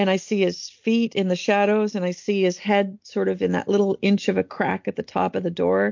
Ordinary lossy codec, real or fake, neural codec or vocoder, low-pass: MP3, 48 kbps; real; none; 7.2 kHz